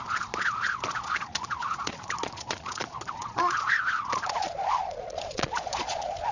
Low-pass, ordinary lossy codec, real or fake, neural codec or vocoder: 7.2 kHz; none; fake; vocoder, 22.05 kHz, 80 mel bands, Vocos